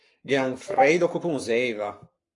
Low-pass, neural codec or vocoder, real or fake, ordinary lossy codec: 10.8 kHz; codec, 44.1 kHz, 7.8 kbps, Pupu-Codec; fake; AAC, 48 kbps